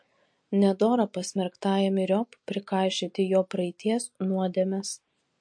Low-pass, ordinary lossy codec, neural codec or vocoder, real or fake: 9.9 kHz; MP3, 48 kbps; none; real